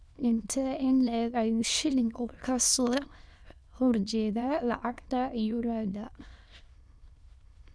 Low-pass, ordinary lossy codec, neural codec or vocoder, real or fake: none; none; autoencoder, 22.05 kHz, a latent of 192 numbers a frame, VITS, trained on many speakers; fake